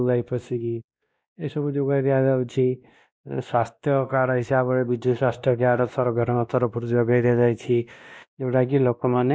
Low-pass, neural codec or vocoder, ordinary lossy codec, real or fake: none; codec, 16 kHz, 1 kbps, X-Codec, WavLM features, trained on Multilingual LibriSpeech; none; fake